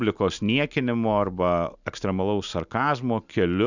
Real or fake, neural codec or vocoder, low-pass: real; none; 7.2 kHz